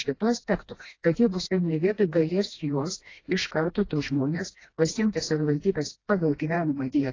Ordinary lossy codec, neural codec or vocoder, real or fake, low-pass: AAC, 32 kbps; codec, 16 kHz, 1 kbps, FreqCodec, smaller model; fake; 7.2 kHz